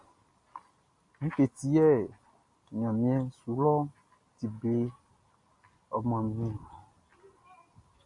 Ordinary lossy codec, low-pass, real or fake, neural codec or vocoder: MP3, 48 kbps; 10.8 kHz; real; none